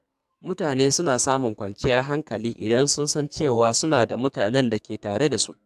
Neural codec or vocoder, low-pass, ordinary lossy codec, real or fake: codec, 44.1 kHz, 2.6 kbps, SNAC; 14.4 kHz; AAC, 96 kbps; fake